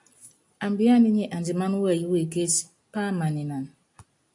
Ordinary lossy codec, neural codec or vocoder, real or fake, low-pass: AAC, 64 kbps; none; real; 10.8 kHz